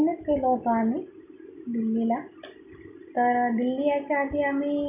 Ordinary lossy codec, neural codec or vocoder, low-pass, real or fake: none; none; 3.6 kHz; real